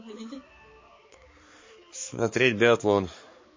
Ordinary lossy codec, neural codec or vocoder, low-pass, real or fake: MP3, 32 kbps; codec, 16 kHz, 4 kbps, X-Codec, HuBERT features, trained on balanced general audio; 7.2 kHz; fake